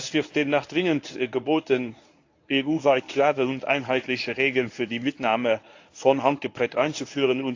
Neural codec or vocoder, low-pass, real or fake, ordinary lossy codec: codec, 24 kHz, 0.9 kbps, WavTokenizer, medium speech release version 1; 7.2 kHz; fake; AAC, 48 kbps